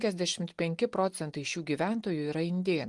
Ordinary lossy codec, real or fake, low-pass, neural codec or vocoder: Opus, 24 kbps; real; 10.8 kHz; none